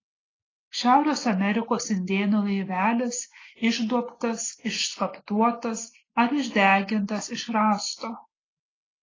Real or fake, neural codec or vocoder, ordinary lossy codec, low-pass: real; none; AAC, 32 kbps; 7.2 kHz